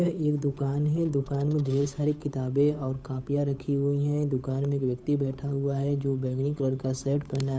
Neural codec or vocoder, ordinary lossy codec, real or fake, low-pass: codec, 16 kHz, 8 kbps, FunCodec, trained on Chinese and English, 25 frames a second; none; fake; none